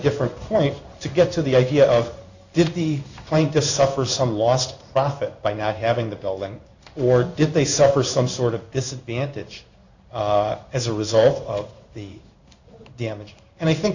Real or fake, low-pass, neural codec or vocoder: fake; 7.2 kHz; codec, 16 kHz in and 24 kHz out, 1 kbps, XY-Tokenizer